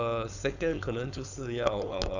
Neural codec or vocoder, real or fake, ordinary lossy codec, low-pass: codec, 16 kHz, 4.8 kbps, FACodec; fake; none; 7.2 kHz